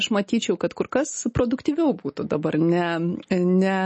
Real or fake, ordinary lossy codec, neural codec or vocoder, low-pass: real; MP3, 32 kbps; none; 10.8 kHz